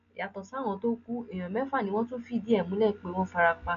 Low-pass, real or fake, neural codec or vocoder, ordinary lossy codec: 7.2 kHz; real; none; AAC, 96 kbps